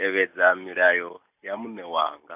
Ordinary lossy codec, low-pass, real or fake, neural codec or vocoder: AAC, 32 kbps; 3.6 kHz; real; none